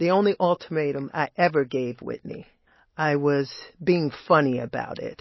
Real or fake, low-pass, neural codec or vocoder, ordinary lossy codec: real; 7.2 kHz; none; MP3, 24 kbps